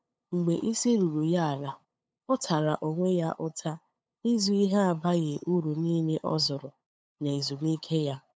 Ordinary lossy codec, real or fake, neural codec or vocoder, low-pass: none; fake; codec, 16 kHz, 8 kbps, FunCodec, trained on LibriTTS, 25 frames a second; none